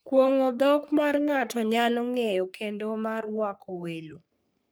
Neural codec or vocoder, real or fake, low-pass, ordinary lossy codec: codec, 44.1 kHz, 3.4 kbps, Pupu-Codec; fake; none; none